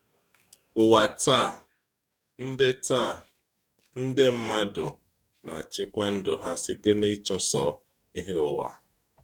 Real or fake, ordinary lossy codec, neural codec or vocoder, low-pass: fake; none; codec, 44.1 kHz, 2.6 kbps, DAC; 19.8 kHz